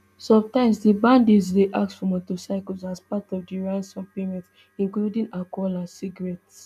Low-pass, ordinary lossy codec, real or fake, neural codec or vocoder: 14.4 kHz; none; real; none